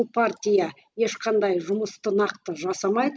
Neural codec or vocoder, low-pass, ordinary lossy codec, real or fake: none; none; none; real